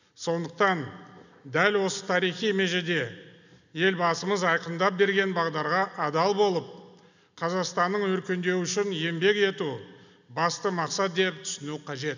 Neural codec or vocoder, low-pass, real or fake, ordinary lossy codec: none; 7.2 kHz; real; none